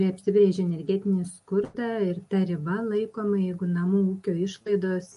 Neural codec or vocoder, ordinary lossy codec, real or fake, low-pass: none; MP3, 48 kbps; real; 14.4 kHz